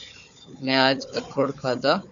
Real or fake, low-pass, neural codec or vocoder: fake; 7.2 kHz; codec, 16 kHz, 4 kbps, FunCodec, trained on Chinese and English, 50 frames a second